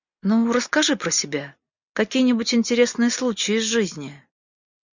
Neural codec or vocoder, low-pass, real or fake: none; 7.2 kHz; real